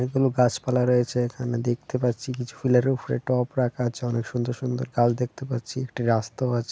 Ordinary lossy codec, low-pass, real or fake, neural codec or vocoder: none; none; real; none